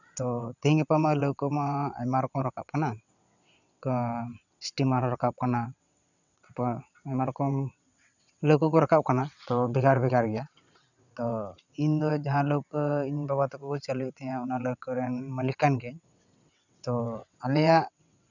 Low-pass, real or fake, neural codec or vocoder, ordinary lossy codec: 7.2 kHz; fake; vocoder, 22.05 kHz, 80 mel bands, WaveNeXt; none